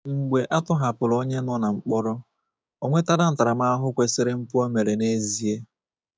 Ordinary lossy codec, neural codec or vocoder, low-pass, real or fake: none; codec, 16 kHz, 6 kbps, DAC; none; fake